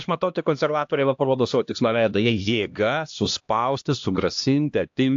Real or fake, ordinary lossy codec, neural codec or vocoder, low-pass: fake; AAC, 64 kbps; codec, 16 kHz, 1 kbps, X-Codec, HuBERT features, trained on LibriSpeech; 7.2 kHz